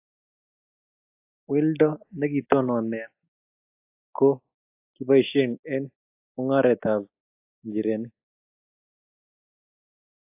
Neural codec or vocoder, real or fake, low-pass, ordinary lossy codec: none; real; 3.6 kHz; AAC, 24 kbps